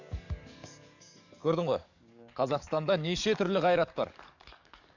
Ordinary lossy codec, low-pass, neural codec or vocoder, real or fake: none; 7.2 kHz; codec, 44.1 kHz, 7.8 kbps, DAC; fake